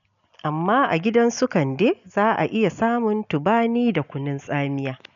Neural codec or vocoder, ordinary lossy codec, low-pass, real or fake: none; none; 7.2 kHz; real